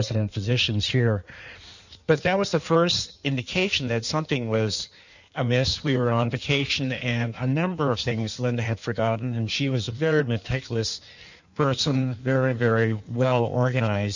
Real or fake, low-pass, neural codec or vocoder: fake; 7.2 kHz; codec, 16 kHz in and 24 kHz out, 1.1 kbps, FireRedTTS-2 codec